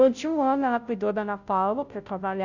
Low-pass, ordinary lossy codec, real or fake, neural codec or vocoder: 7.2 kHz; none; fake; codec, 16 kHz, 0.5 kbps, FunCodec, trained on Chinese and English, 25 frames a second